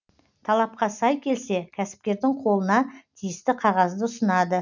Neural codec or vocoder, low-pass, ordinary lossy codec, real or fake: none; 7.2 kHz; none; real